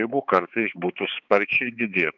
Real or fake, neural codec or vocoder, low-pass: fake; codec, 16 kHz, 4 kbps, X-Codec, HuBERT features, trained on balanced general audio; 7.2 kHz